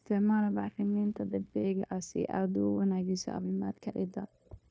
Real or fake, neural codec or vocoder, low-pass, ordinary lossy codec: fake; codec, 16 kHz, 0.9 kbps, LongCat-Audio-Codec; none; none